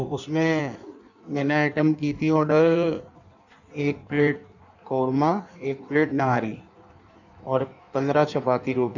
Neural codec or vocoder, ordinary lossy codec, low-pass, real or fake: codec, 16 kHz in and 24 kHz out, 1.1 kbps, FireRedTTS-2 codec; none; 7.2 kHz; fake